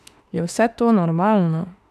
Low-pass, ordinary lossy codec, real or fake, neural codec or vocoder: 14.4 kHz; none; fake; autoencoder, 48 kHz, 32 numbers a frame, DAC-VAE, trained on Japanese speech